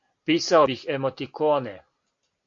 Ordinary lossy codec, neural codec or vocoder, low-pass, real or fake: AAC, 48 kbps; none; 7.2 kHz; real